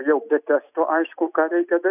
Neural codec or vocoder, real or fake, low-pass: none; real; 3.6 kHz